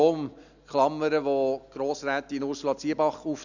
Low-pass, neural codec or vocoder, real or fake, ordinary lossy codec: 7.2 kHz; none; real; none